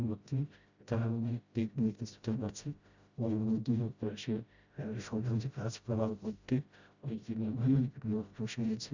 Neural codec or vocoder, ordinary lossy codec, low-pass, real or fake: codec, 16 kHz, 0.5 kbps, FreqCodec, smaller model; Opus, 64 kbps; 7.2 kHz; fake